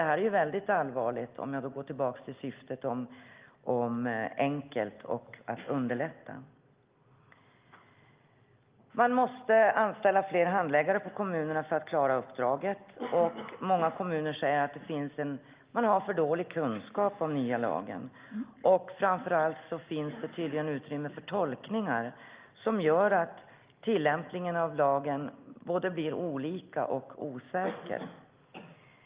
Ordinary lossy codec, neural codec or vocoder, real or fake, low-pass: Opus, 32 kbps; none; real; 3.6 kHz